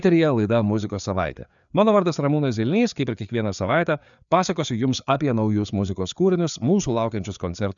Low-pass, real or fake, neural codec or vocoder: 7.2 kHz; fake; codec, 16 kHz, 4 kbps, FreqCodec, larger model